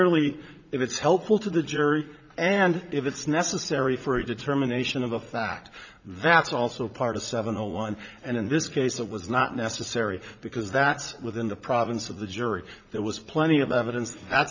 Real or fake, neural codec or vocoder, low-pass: fake; vocoder, 44.1 kHz, 80 mel bands, Vocos; 7.2 kHz